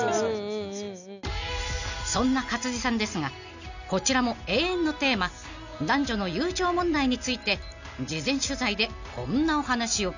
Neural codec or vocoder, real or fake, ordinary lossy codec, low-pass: none; real; none; 7.2 kHz